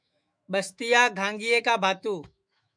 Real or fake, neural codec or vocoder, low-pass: fake; autoencoder, 48 kHz, 128 numbers a frame, DAC-VAE, trained on Japanese speech; 9.9 kHz